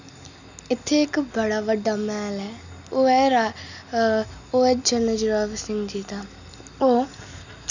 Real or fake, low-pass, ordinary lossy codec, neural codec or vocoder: real; 7.2 kHz; none; none